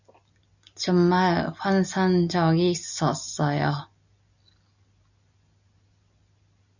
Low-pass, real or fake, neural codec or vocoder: 7.2 kHz; real; none